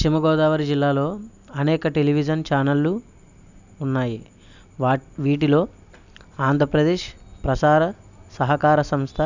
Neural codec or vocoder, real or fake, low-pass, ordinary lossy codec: none; real; 7.2 kHz; none